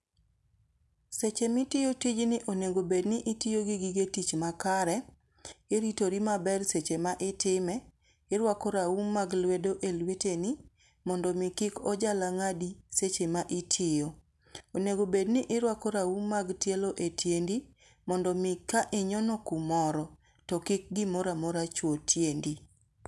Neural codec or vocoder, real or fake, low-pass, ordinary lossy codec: none; real; none; none